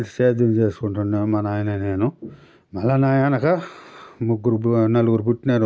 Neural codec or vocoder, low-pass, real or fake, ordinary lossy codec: none; none; real; none